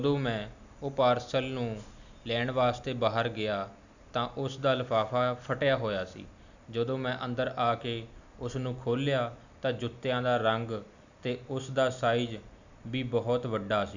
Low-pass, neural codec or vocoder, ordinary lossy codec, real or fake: 7.2 kHz; none; none; real